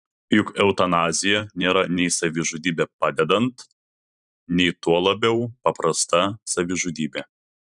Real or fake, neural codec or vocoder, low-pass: fake; vocoder, 44.1 kHz, 128 mel bands every 512 samples, BigVGAN v2; 10.8 kHz